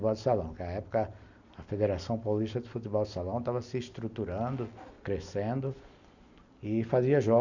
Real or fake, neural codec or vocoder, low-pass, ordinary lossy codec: real; none; 7.2 kHz; none